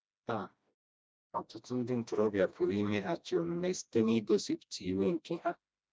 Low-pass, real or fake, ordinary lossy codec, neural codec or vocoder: none; fake; none; codec, 16 kHz, 1 kbps, FreqCodec, smaller model